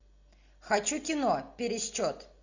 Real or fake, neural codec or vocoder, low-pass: real; none; 7.2 kHz